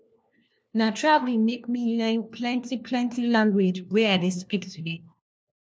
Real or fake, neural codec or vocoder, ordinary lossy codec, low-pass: fake; codec, 16 kHz, 1 kbps, FunCodec, trained on LibriTTS, 50 frames a second; none; none